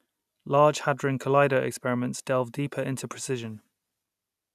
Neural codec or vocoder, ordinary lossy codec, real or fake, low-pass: none; none; real; 14.4 kHz